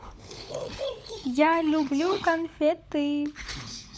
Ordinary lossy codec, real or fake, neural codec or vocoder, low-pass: none; fake; codec, 16 kHz, 16 kbps, FunCodec, trained on LibriTTS, 50 frames a second; none